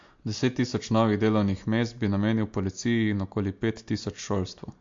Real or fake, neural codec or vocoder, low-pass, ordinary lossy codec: real; none; 7.2 kHz; MP3, 48 kbps